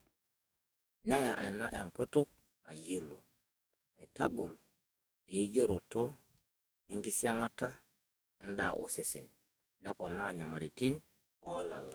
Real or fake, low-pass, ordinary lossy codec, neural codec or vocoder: fake; none; none; codec, 44.1 kHz, 2.6 kbps, DAC